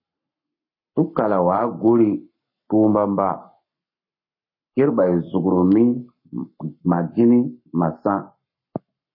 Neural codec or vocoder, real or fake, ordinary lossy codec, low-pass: codec, 44.1 kHz, 7.8 kbps, Pupu-Codec; fake; MP3, 24 kbps; 5.4 kHz